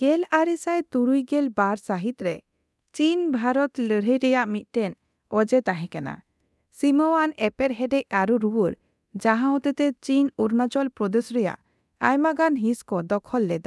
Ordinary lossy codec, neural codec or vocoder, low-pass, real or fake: none; codec, 24 kHz, 0.9 kbps, DualCodec; none; fake